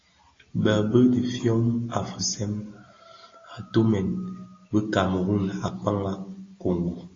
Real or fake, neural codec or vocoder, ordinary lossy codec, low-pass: real; none; AAC, 32 kbps; 7.2 kHz